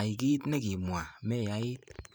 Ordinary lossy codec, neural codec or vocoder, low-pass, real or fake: none; vocoder, 44.1 kHz, 128 mel bands every 512 samples, BigVGAN v2; none; fake